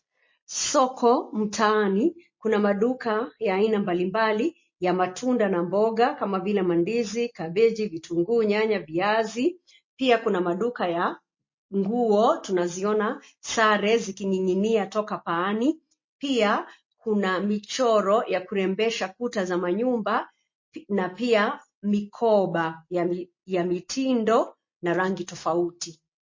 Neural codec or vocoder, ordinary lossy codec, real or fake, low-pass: none; MP3, 32 kbps; real; 7.2 kHz